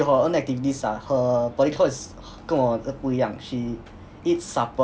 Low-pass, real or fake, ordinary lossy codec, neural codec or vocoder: none; real; none; none